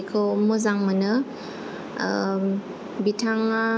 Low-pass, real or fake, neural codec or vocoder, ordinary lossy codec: none; real; none; none